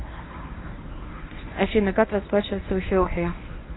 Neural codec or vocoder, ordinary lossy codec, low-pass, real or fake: codec, 24 kHz, 0.9 kbps, WavTokenizer, medium speech release version 1; AAC, 16 kbps; 7.2 kHz; fake